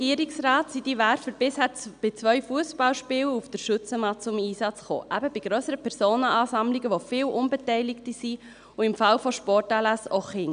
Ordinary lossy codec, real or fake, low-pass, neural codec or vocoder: none; real; 9.9 kHz; none